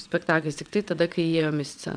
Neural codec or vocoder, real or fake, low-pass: vocoder, 22.05 kHz, 80 mel bands, WaveNeXt; fake; 9.9 kHz